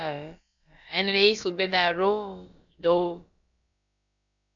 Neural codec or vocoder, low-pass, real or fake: codec, 16 kHz, about 1 kbps, DyCAST, with the encoder's durations; 7.2 kHz; fake